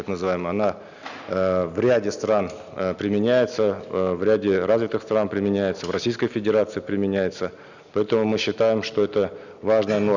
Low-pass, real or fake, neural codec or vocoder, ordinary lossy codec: 7.2 kHz; real; none; none